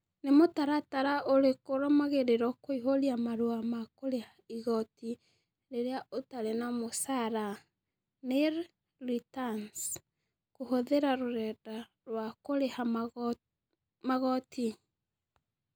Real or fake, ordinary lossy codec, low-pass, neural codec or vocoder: real; none; none; none